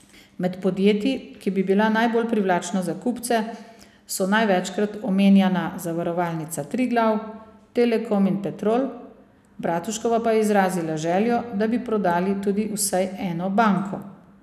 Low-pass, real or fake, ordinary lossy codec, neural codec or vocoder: 14.4 kHz; real; none; none